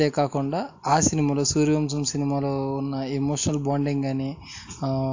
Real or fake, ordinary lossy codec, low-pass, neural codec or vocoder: real; AAC, 48 kbps; 7.2 kHz; none